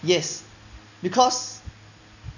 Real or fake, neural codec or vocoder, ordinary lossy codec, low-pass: real; none; none; 7.2 kHz